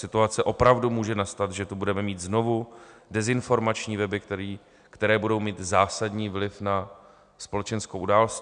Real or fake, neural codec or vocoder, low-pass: real; none; 9.9 kHz